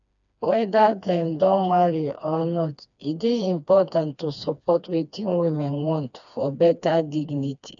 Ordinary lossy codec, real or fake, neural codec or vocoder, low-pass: none; fake; codec, 16 kHz, 2 kbps, FreqCodec, smaller model; 7.2 kHz